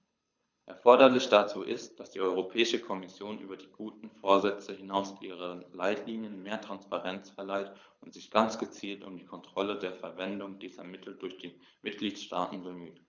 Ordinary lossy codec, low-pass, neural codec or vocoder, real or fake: none; 7.2 kHz; codec, 24 kHz, 6 kbps, HILCodec; fake